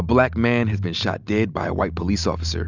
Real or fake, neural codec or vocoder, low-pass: real; none; 7.2 kHz